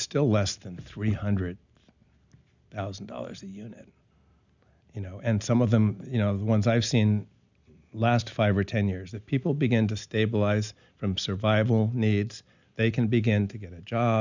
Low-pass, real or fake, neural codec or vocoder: 7.2 kHz; real; none